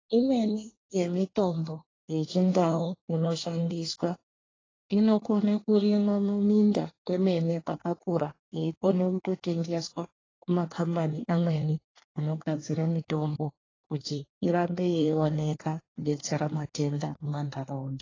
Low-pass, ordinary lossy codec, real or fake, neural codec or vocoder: 7.2 kHz; AAC, 32 kbps; fake; codec, 24 kHz, 1 kbps, SNAC